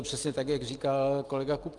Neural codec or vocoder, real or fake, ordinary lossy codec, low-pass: none; real; Opus, 32 kbps; 10.8 kHz